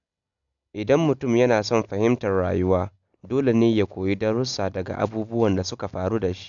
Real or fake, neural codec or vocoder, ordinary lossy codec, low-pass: real; none; none; 7.2 kHz